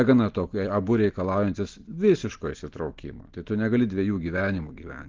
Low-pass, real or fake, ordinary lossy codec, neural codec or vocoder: 7.2 kHz; real; Opus, 16 kbps; none